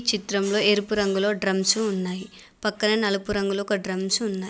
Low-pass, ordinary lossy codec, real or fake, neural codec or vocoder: none; none; real; none